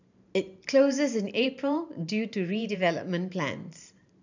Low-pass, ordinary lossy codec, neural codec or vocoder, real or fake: 7.2 kHz; none; vocoder, 44.1 kHz, 128 mel bands every 512 samples, BigVGAN v2; fake